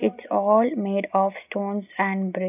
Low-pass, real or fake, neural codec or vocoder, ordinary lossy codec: 3.6 kHz; real; none; none